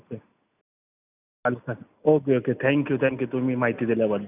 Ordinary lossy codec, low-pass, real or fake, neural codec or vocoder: none; 3.6 kHz; real; none